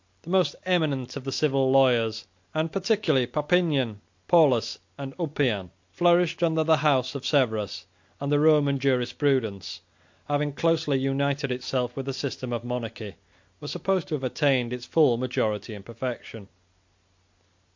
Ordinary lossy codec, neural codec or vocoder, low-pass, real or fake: MP3, 48 kbps; none; 7.2 kHz; real